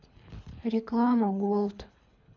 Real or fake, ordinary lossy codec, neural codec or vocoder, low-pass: fake; none; codec, 24 kHz, 3 kbps, HILCodec; 7.2 kHz